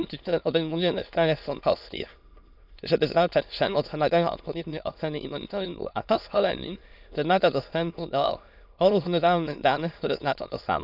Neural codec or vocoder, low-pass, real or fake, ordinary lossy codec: autoencoder, 22.05 kHz, a latent of 192 numbers a frame, VITS, trained on many speakers; 5.4 kHz; fake; none